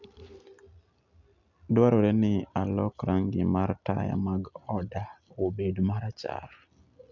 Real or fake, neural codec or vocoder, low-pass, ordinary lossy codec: real; none; 7.2 kHz; none